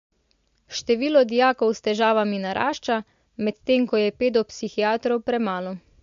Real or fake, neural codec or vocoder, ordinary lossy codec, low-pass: real; none; MP3, 48 kbps; 7.2 kHz